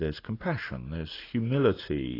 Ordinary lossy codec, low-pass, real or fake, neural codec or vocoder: AAC, 24 kbps; 5.4 kHz; real; none